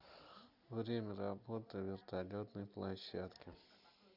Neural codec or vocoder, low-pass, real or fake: none; 5.4 kHz; real